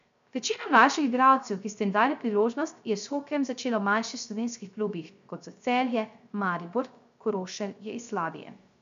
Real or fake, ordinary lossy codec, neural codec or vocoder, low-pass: fake; MP3, 64 kbps; codec, 16 kHz, 0.3 kbps, FocalCodec; 7.2 kHz